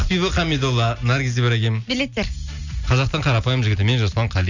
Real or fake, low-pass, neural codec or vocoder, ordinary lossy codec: real; 7.2 kHz; none; none